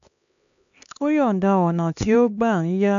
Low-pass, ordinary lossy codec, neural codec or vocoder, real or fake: 7.2 kHz; AAC, 96 kbps; codec, 16 kHz, 2 kbps, X-Codec, HuBERT features, trained on LibriSpeech; fake